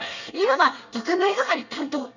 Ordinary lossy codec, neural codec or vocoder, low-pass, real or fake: none; codec, 24 kHz, 1 kbps, SNAC; 7.2 kHz; fake